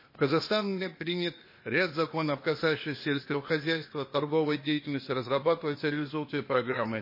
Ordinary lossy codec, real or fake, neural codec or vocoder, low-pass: MP3, 24 kbps; fake; codec, 16 kHz, 0.8 kbps, ZipCodec; 5.4 kHz